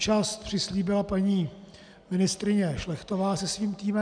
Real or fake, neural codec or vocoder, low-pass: real; none; 9.9 kHz